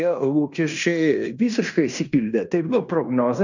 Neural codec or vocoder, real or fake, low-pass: codec, 16 kHz in and 24 kHz out, 0.9 kbps, LongCat-Audio-Codec, fine tuned four codebook decoder; fake; 7.2 kHz